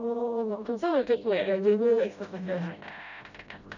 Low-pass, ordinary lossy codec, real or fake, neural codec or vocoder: 7.2 kHz; none; fake; codec, 16 kHz, 0.5 kbps, FreqCodec, smaller model